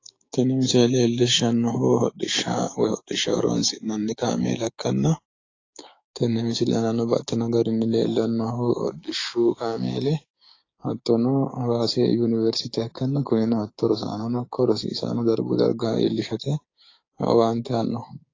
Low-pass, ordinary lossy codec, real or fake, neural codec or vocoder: 7.2 kHz; AAC, 32 kbps; fake; codec, 16 kHz, 6 kbps, DAC